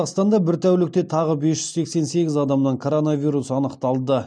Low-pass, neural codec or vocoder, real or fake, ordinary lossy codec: 9.9 kHz; none; real; none